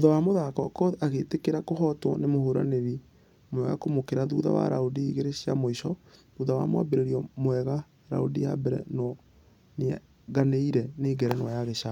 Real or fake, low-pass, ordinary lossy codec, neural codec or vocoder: real; 19.8 kHz; none; none